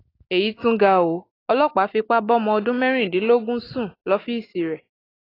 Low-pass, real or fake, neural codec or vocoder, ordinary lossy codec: 5.4 kHz; real; none; AAC, 24 kbps